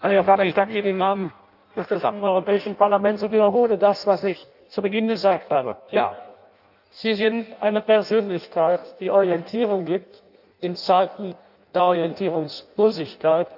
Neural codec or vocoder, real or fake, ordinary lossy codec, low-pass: codec, 16 kHz in and 24 kHz out, 0.6 kbps, FireRedTTS-2 codec; fake; none; 5.4 kHz